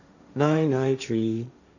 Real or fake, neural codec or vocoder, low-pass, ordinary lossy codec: fake; codec, 16 kHz, 1.1 kbps, Voila-Tokenizer; 7.2 kHz; none